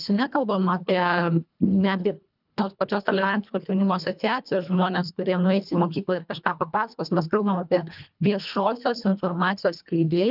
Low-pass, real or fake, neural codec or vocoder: 5.4 kHz; fake; codec, 24 kHz, 1.5 kbps, HILCodec